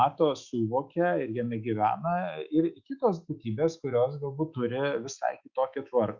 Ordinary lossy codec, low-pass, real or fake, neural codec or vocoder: Opus, 64 kbps; 7.2 kHz; fake; autoencoder, 48 kHz, 128 numbers a frame, DAC-VAE, trained on Japanese speech